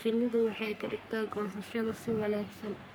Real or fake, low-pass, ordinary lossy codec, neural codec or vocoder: fake; none; none; codec, 44.1 kHz, 3.4 kbps, Pupu-Codec